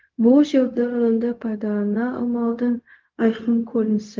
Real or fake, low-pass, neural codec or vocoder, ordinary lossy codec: fake; 7.2 kHz; codec, 16 kHz, 0.4 kbps, LongCat-Audio-Codec; Opus, 32 kbps